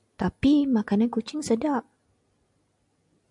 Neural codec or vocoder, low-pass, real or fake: none; 10.8 kHz; real